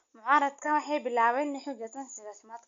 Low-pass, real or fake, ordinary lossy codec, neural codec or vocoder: 7.2 kHz; real; AAC, 64 kbps; none